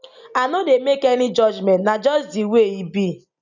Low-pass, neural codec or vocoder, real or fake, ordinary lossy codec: 7.2 kHz; none; real; none